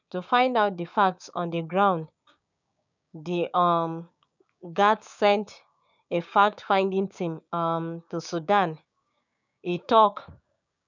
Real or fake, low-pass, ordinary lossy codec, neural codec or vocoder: fake; 7.2 kHz; none; codec, 16 kHz, 6 kbps, DAC